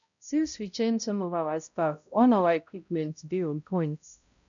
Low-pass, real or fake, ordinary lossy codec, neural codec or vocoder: 7.2 kHz; fake; none; codec, 16 kHz, 0.5 kbps, X-Codec, HuBERT features, trained on balanced general audio